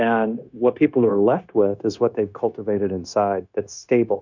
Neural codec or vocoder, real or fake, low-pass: codec, 16 kHz, 0.9 kbps, LongCat-Audio-Codec; fake; 7.2 kHz